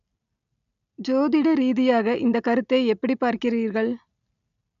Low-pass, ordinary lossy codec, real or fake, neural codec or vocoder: 7.2 kHz; none; real; none